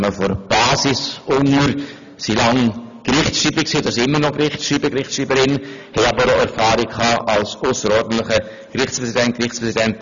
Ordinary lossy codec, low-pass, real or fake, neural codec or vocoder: none; 7.2 kHz; real; none